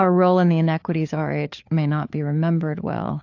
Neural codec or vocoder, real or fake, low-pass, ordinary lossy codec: codec, 16 kHz, 4 kbps, FunCodec, trained on LibriTTS, 50 frames a second; fake; 7.2 kHz; AAC, 48 kbps